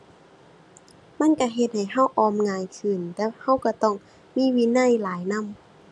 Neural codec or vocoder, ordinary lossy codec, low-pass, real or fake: none; none; none; real